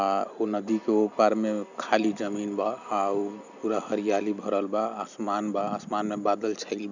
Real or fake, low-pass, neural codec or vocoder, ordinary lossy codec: real; 7.2 kHz; none; none